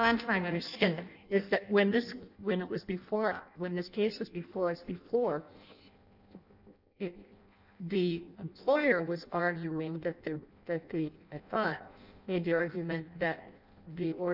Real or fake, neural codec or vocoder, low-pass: fake; codec, 16 kHz in and 24 kHz out, 0.6 kbps, FireRedTTS-2 codec; 5.4 kHz